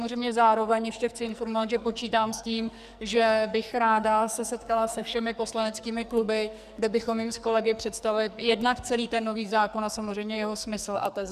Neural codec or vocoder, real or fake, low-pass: codec, 44.1 kHz, 2.6 kbps, SNAC; fake; 14.4 kHz